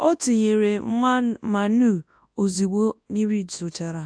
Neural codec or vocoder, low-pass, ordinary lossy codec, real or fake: codec, 24 kHz, 0.9 kbps, WavTokenizer, large speech release; 9.9 kHz; AAC, 64 kbps; fake